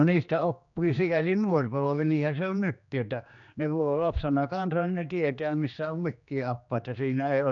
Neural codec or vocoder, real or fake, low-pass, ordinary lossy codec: codec, 16 kHz, 2 kbps, X-Codec, HuBERT features, trained on general audio; fake; 7.2 kHz; none